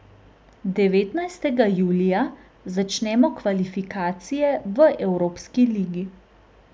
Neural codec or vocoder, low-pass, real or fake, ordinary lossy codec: none; none; real; none